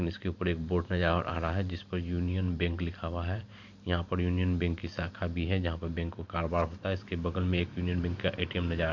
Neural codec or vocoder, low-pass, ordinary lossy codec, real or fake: vocoder, 44.1 kHz, 128 mel bands every 512 samples, BigVGAN v2; 7.2 kHz; MP3, 64 kbps; fake